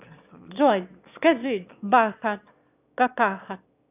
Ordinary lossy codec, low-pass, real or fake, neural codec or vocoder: none; 3.6 kHz; fake; autoencoder, 22.05 kHz, a latent of 192 numbers a frame, VITS, trained on one speaker